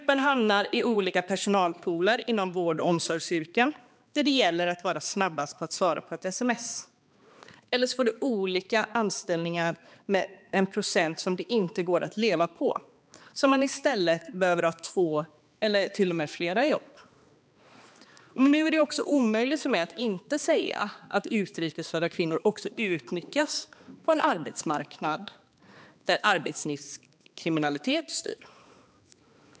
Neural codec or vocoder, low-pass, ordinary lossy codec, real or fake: codec, 16 kHz, 2 kbps, X-Codec, HuBERT features, trained on balanced general audio; none; none; fake